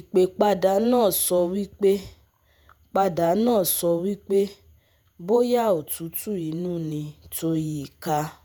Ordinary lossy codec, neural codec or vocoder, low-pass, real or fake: none; vocoder, 48 kHz, 128 mel bands, Vocos; none; fake